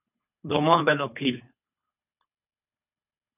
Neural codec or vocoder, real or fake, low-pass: codec, 24 kHz, 3 kbps, HILCodec; fake; 3.6 kHz